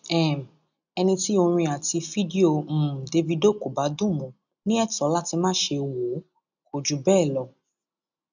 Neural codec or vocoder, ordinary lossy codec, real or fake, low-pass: none; none; real; 7.2 kHz